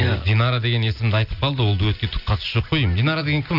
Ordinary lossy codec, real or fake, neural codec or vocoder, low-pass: none; real; none; 5.4 kHz